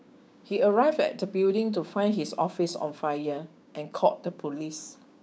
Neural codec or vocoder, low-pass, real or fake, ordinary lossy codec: codec, 16 kHz, 6 kbps, DAC; none; fake; none